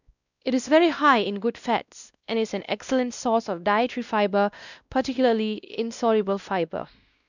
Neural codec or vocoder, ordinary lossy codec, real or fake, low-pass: codec, 16 kHz, 1 kbps, X-Codec, WavLM features, trained on Multilingual LibriSpeech; none; fake; 7.2 kHz